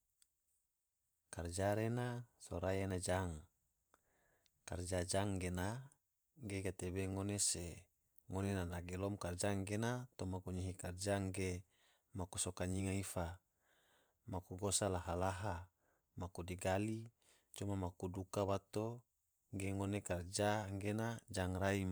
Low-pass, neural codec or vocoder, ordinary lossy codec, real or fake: none; vocoder, 44.1 kHz, 128 mel bands every 512 samples, BigVGAN v2; none; fake